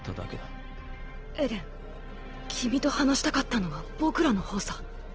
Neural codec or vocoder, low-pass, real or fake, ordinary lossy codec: none; 7.2 kHz; real; Opus, 16 kbps